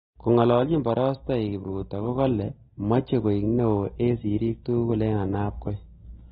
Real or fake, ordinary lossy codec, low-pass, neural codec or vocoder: real; AAC, 16 kbps; 19.8 kHz; none